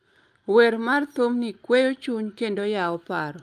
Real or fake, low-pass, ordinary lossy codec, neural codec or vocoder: real; 14.4 kHz; Opus, 32 kbps; none